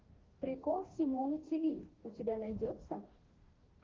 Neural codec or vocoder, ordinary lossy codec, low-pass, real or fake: codec, 44.1 kHz, 2.6 kbps, DAC; Opus, 16 kbps; 7.2 kHz; fake